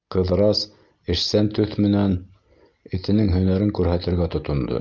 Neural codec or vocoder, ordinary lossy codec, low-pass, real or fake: none; Opus, 32 kbps; 7.2 kHz; real